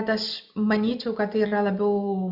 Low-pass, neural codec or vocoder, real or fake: 5.4 kHz; none; real